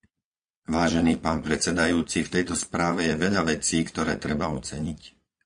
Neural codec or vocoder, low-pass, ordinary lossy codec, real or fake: vocoder, 22.05 kHz, 80 mel bands, Vocos; 9.9 kHz; MP3, 64 kbps; fake